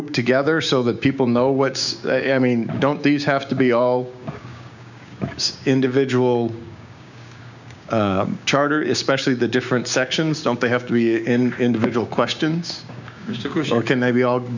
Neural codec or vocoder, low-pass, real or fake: codec, 16 kHz, 6 kbps, DAC; 7.2 kHz; fake